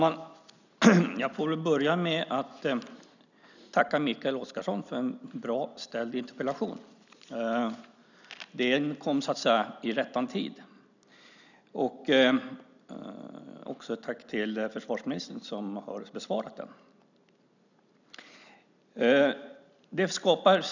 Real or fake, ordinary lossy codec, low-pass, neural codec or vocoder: real; none; 7.2 kHz; none